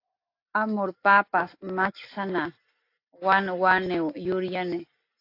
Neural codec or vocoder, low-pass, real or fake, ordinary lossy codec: none; 5.4 kHz; real; AAC, 32 kbps